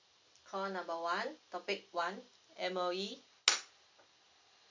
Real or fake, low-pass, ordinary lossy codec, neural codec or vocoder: real; 7.2 kHz; MP3, 64 kbps; none